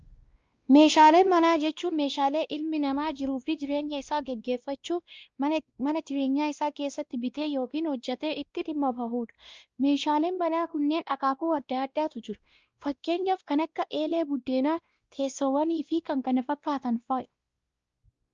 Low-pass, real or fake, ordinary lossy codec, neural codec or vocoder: 7.2 kHz; fake; Opus, 24 kbps; codec, 16 kHz, 1 kbps, X-Codec, WavLM features, trained on Multilingual LibriSpeech